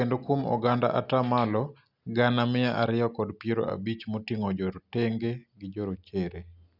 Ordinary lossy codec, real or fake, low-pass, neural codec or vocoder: none; real; 5.4 kHz; none